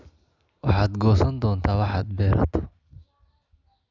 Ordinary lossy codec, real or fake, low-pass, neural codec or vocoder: none; real; 7.2 kHz; none